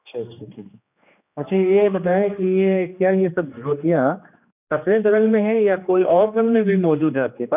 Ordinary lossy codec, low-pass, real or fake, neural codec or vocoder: none; 3.6 kHz; fake; codec, 16 kHz, 2 kbps, X-Codec, HuBERT features, trained on general audio